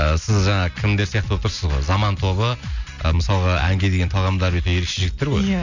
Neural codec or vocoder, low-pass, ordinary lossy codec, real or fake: none; 7.2 kHz; none; real